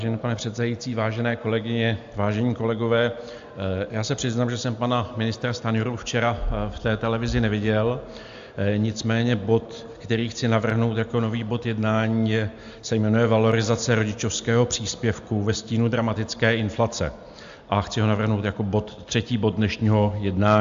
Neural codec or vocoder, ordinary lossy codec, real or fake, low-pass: none; AAC, 64 kbps; real; 7.2 kHz